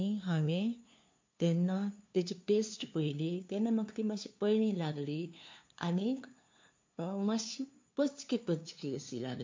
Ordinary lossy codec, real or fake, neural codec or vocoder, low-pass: MP3, 48 kbps; fake; codec, 16 kHz, 2 kbps, FunCodec, trained on LibriTTS, 25 frames a second; 7.2 kHz